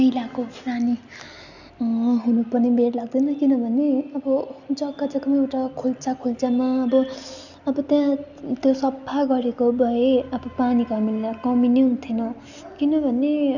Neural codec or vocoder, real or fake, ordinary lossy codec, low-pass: none; real; none; 7.2 kHz